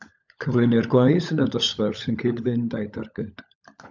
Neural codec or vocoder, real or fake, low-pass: codec, 16 kHz, 16 kbps, FunCodec, trained on LibriTTS, 50 frames a second; fake; 7.2 kHz